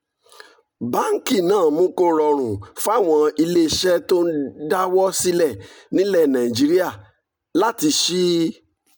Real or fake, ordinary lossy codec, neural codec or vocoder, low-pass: real; none; none; none